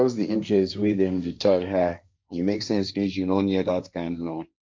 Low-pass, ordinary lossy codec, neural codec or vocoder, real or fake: none; none; codec, 16 kHz, 1.1 kbps, Voila-Tokenizer; fake